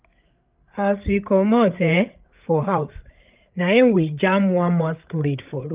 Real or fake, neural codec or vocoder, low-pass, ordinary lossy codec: fake; codec, 16 kHz, 8 kbps, FreqCodec, larger model; 3.6 kHz; Opus, 24 kbps